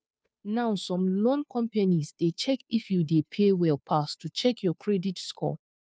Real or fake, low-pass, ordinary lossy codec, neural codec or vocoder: fake; none; none; codec, 16 kHz, 2 kbps, FunCodec, trained on Chinese and English, 25 frames a second